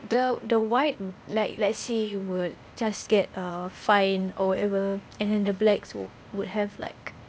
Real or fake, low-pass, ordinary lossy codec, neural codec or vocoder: fake; none; none; codec, 16 kHz, 0.8 kbps, ZipCodec